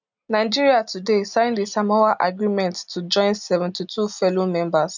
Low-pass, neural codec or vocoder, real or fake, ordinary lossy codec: 7.2 kHz; none; real; none